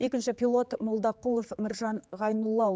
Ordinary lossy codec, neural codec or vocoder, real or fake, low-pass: none; codec, 16 kHz, 4 kbps, X-Codec, HuBERT features, trained on general audio; fake; none